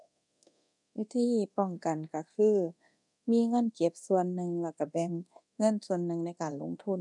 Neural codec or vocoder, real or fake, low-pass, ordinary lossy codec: codec, 24 kHz, 0.9 kbps, DualCodec; fake; 10.8 kHz; none